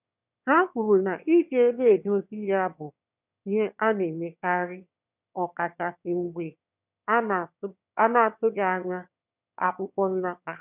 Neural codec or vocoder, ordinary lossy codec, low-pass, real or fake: autoencoder, 22.05 kHz, a latent of 192 numbers a frame, VITS, trained on one speaker; none; 3.6 kHz; fake